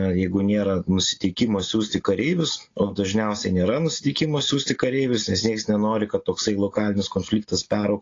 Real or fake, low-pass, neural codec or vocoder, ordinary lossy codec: real; 7.2 kHz; none; AAC, 32 kbps